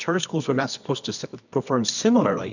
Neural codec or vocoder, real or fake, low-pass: codec, 24 kHz, 0.9 kbps, WavTokenizer, medium music audio release; fake; 7.2 kHz